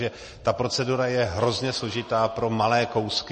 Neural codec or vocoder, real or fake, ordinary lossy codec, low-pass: none; real; MP3, 32 kbps; 10.8 kHz